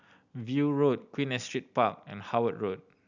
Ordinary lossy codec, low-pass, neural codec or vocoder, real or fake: none; 7.2 kHz; none; real